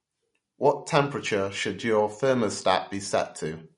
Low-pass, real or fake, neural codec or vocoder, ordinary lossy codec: 10.8 kHz; real; none; MP3, 48 kbps